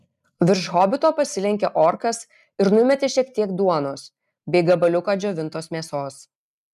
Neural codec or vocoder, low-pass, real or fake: none; 14.4 kHz; real